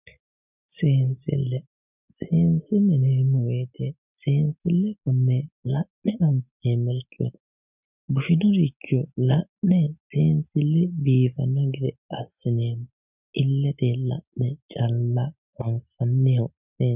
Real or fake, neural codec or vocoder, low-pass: real; none; 3.6 kHz